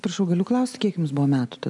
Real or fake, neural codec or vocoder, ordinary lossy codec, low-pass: real; none; MP3, 96 kbps; 10.8 kHz